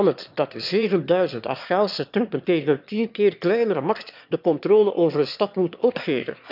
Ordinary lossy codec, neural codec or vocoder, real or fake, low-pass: none; autoencoder, 22.05 kHz, a latent of 192 numbers a frame, VITS, trained on one speaker; fake; 5.4 kHz